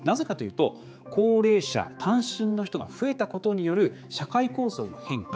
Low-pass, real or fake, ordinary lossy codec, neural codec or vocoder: none; fake; none; codec, 16 kHz, 4 kbps, X-Codec, HuBERT features, trained on general audio